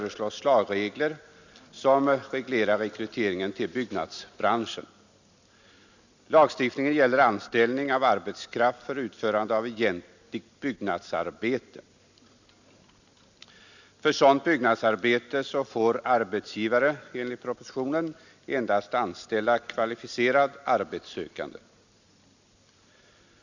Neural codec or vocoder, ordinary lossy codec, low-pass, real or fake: none; none; 7.2 kHz; real